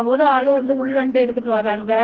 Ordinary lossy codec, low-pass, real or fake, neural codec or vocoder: Opus, 16 kbps; 7.2 kHz; fake; codec, 16 kHz, 1 kbps, FreqCodec, smaller model